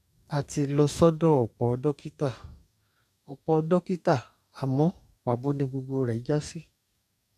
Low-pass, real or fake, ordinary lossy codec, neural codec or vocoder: 14.4 kHz; fake; none; codec, 44.1 kHz, 2.6 kbps, DAC